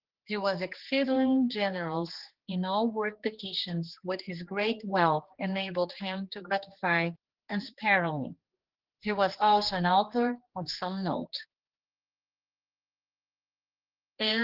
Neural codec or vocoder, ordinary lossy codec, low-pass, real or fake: codec, 16 kHz, 2 kbps, X-Codec, HuBERT features, trained on general audio; Opus, 16 kbps; 5.4 kHz; fake